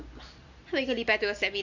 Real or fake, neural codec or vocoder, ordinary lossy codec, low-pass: real; none; none; 7.2 kHz